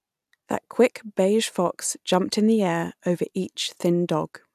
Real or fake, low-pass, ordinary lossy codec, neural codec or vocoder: real; 14.4 kHz; none; none